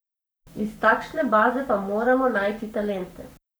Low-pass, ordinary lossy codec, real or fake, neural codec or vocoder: none; none; fake; codec, 44.1 kHz, 7.8 kbps, Pupu-Codec